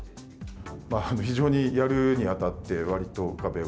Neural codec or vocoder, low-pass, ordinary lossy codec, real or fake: none; none; none; real